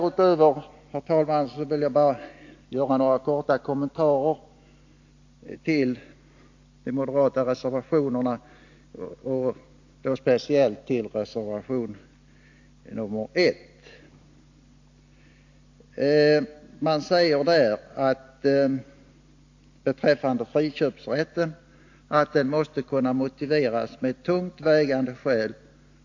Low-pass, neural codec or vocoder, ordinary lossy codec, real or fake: 7.2 kHz; none; AAC, 48 kbps; real